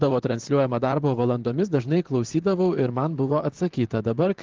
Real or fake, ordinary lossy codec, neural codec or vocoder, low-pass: fake; Opus, 16 kbps; vocoder, 44.1 kHz, 128 mel bands, Pupu-Vocoder; 7.2 kHz